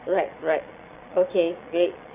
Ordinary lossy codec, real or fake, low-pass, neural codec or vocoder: none; fake; 3.6 kHz; vocoder, 22.05 kHz, 80 mel bands, Vocos